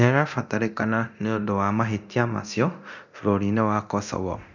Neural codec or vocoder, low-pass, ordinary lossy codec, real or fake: codec, 24 kHz, 0.9 kbps, DualCodec; 7.2 kHz; none; fake